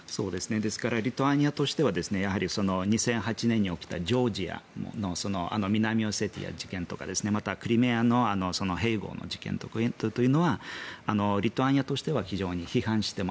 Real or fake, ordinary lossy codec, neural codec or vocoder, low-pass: real; none; none; none